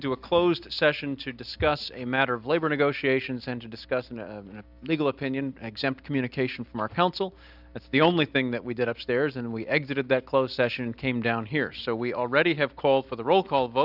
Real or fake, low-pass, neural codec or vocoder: real; 5.4 kHz; none